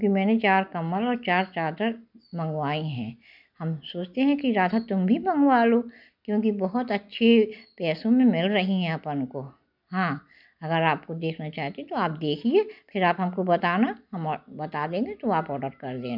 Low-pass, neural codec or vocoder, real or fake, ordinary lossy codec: 5.4 kHz; none; real; none